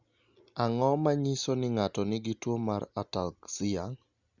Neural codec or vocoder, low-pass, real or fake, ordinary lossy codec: none; 7.2 kHz; real; Opus, 64 kbps